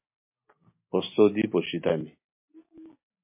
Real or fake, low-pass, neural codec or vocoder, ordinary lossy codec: real; 3.6 kHz; none; MP3, 24 kbps